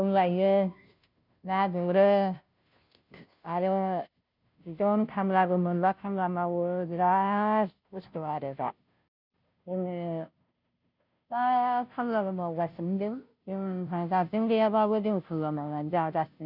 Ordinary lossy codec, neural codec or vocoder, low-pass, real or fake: none; codec, 16 kHz, 0.5 kbps, FunCodec, trained on Chinese and English, 25 frames a second; 5.4 kHz; fake